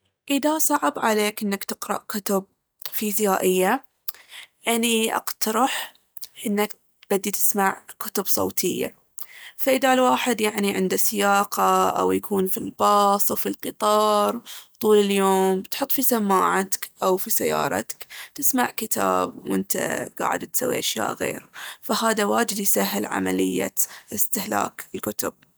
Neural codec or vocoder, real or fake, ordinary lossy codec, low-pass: none; real; none; none